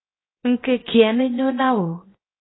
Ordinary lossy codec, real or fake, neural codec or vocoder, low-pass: AAC, 16 kbps; fake; codec, 16 kHz, 0.7 kbps, FocalCodec; 7.2 kHz